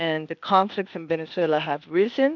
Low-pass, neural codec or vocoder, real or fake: 7.2 kHz; codec, 16 kHz, 0.8 kbps, ZipCodec; fake